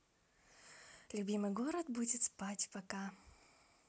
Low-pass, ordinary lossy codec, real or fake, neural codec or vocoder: none; none; real; none